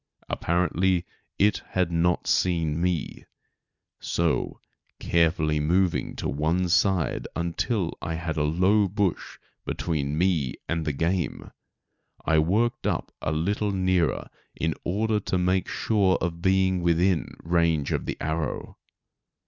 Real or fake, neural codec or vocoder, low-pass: real; none; 7.2 kHz